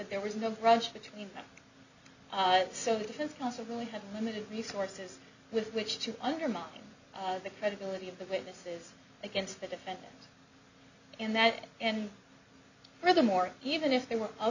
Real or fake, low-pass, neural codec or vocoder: real; 7.2 kHz; none